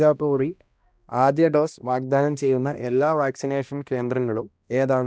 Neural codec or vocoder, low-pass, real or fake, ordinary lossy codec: codec, 16 kHz, 1 kbps, X-Codec, HuBERT features, trained on balanced general audio; none; fake; none